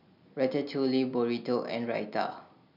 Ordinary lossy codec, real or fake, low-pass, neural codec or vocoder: none; real; 5.4 kHz; none